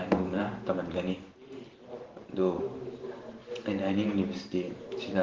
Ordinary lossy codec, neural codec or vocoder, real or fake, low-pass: Opus, 16 kbps; vocoder, 44.1 kHz, 128 mel bands every 512 samples, BigVGAN v2; fake; 7.2 kHz